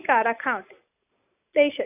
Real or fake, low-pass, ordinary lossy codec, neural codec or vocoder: real; 3.6 kHz; none; none